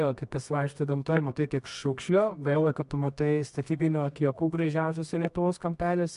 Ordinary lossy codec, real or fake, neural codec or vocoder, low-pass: MP3, 64 kbps; fake; codec, 24 kHz, 0.9 kbps, WavTokenizer, medium music audio release; 10.8 kHz